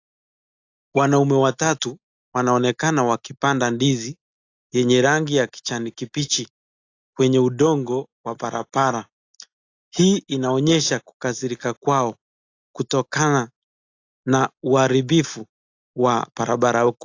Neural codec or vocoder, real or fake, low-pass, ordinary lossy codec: none; real; 7.2 kHz; AAC, 48 kbps